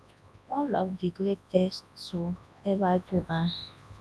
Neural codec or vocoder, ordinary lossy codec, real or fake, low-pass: codec, 24 kHz, 0.9 kbps, WavTokenizer, large speech release; none; fake; none